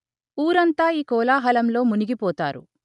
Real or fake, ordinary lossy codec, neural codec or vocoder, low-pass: real; none; none; 10.8 kHz